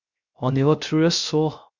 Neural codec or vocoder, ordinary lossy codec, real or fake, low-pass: codec, 16 kHz, 0.3 kbps, FocalCodec; Opus, 64 kbps; fake; 7.2 kHz